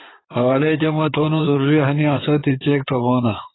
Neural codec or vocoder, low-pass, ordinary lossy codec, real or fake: codec, 16 kHz in and 24 kHz out, 2.2 kbps, FireRedTTS-2 codec; 7.2 kHz; AAC, 16 kbps; fake